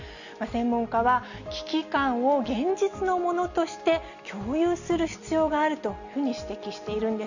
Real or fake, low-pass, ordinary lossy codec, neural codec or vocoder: real; 7.2 kHz; none; none